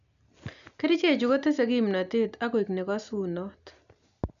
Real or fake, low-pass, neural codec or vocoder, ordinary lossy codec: real; 7.2 kHz; none; none